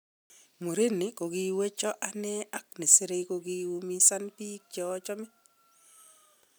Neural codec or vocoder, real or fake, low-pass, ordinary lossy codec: none; real; none; none